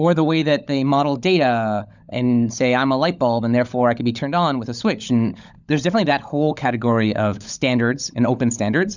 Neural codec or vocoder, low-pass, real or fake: codec, 16 kHz, 16 kbps, FunCodec, trained on LibriTTS, 50 frames a second; 7.2 kHz; fake